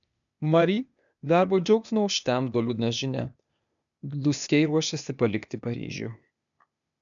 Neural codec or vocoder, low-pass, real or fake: codec, 16 kHz, 0.8 kbps, ZipCodec; 7.2 kHz; fake